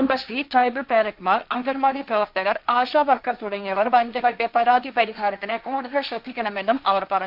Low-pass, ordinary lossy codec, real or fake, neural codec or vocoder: 5.4 kHz; none; fake; codec, 16 kHz, 1.1 kbps, Voila-Tokenizer